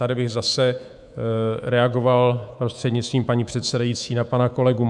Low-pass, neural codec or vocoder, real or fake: 10.8 kHz; codec, 24 kHz, 3.1 kbps, DualCodec; fake